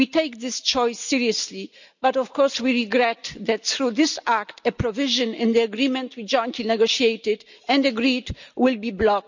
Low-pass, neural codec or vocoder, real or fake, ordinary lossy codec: 7.2 kHz; none; real; none